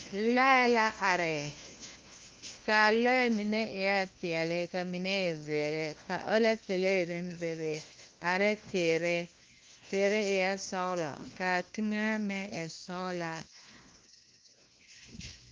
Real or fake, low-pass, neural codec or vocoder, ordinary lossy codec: fake; 7.2 kHz; codec, 16 kHz, 1 kbps, FunCodec, trained on LibriTTS, 50 frames a second; Opus, 32 kbps